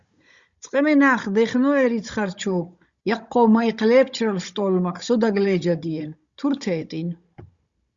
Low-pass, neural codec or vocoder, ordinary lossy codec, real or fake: 7.2 kHz; codec, 16 kHz, 16 kbps, FunCodec, trained on Chinese and English, 50 frames a second; Opus, 64 kbps; fake